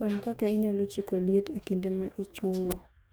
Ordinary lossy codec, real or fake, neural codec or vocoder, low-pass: none; fake; codec, 44.1 kHz, 2.6 kbps, DAC; none